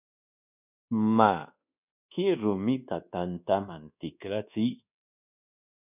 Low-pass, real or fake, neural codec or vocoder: 3.6 kHz; fake; codec, 24 kHz, 1.2 kbps, DualCodec